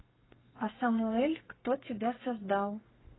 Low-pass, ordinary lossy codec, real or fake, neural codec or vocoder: 7.2 kHz; AAC, 16 kbps; fake; codec, 32 kHz, 1.9 kbps, SNAC